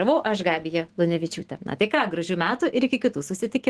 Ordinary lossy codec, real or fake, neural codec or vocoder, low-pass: Opus, 16 kbps; fake; autoencoder, 48 kHz, 128 numbers a frame, DAC-VAE, trained on Japanese speech; 10.8 kHz